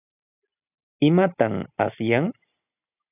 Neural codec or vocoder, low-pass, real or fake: none; 3.6 kHz; real